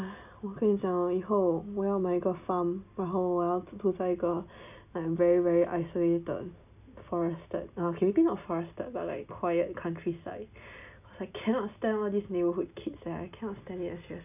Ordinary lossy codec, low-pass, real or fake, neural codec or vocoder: none; 3.6 kHz; real; none